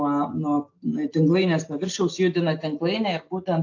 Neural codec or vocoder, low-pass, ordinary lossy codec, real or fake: none; 7.2 kHz; AAC, 48 kbps; real